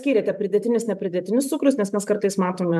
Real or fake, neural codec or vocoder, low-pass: fake; vocoder, 44.1 kHz, 128 mel bands every 512 samples, BigVGAN v2; 14.4 kHz